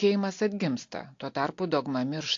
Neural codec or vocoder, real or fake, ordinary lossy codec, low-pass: none; real; AAC, 48 kbps; 7.2 kHz